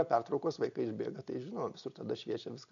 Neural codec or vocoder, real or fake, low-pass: none; real; 7.2 kHz